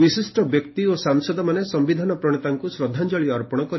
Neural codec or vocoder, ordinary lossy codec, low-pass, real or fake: none; MP3, 24 kbps; 7.2 kHz; real